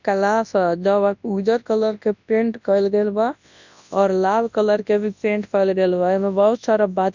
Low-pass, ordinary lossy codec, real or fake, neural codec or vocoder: 7.2 kHz; none; fake; codec, 24 kHz, 0.9 kbps, WavTokenizer, large speech release